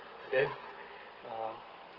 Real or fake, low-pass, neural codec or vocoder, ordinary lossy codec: real; 5.4 kHz; none; Opus, 16 kbps